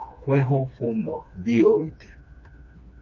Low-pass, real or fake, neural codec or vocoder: 7.2 kHz; fake; codec, 16 kHz, 2 kbps, FreqCodec, smaller model